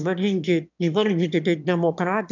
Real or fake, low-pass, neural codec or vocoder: fake; 7.2 kHz; autoencoder, 22.05 kHz, a latent of 192 numbers a frame, VITS, trained on one speaker